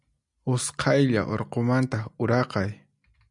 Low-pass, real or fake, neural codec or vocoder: 10.8 kHz; real; none